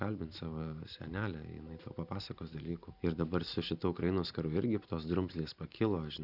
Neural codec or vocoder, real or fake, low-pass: vocoder, 44.1 kHz, 128 mel bands every 512 samples, BigVGAN v2; fake; 5.4 kHz